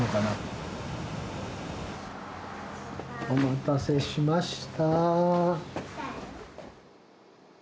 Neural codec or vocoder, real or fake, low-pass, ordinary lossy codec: none; real; none; none